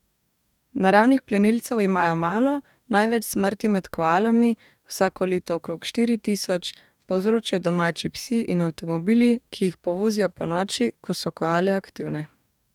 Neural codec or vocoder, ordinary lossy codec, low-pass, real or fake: codec, 44.1 kHz, 2.6 kbps, DAC; none; 19.8 kHz; fake